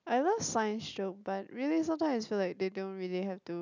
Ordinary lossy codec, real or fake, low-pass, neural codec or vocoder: none; real; 7.2 kHz; none